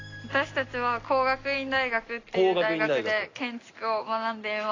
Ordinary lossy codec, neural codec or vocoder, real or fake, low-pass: AAC, 32 kbps; none; real; 7.2 kHz